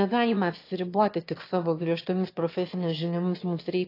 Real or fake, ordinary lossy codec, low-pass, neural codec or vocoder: fake; AAC, 32 kbps; 5.4 kHz; autoencoder, 22.05 kHz, a latent of 192 numbers a frame, VITS, trained on one speaker